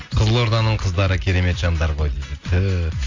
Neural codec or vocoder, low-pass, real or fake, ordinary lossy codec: none; 7.2 kHz; real; AAC, 48 kbps